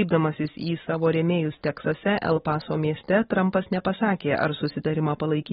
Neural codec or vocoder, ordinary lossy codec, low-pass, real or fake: none; AAC, 16 kbps; 19.8 kHz; real